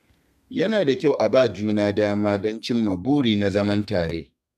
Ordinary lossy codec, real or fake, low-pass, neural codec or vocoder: none; fake; 14.4 kHz; codec, 32 kHz, 1.9 kbps, SNAC